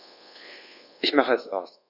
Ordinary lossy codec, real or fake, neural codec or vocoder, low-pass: none; fake; codec, 24 kHz, 1.2 kbps, DualCodec; 5.4 kHz